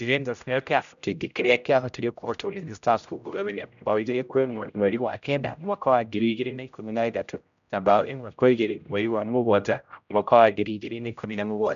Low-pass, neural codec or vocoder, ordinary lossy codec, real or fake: 7.2 kHz; codec, 16 kHz, 0.5 kbps, X-Codec, HuBERT features, trained on general audio; none; fake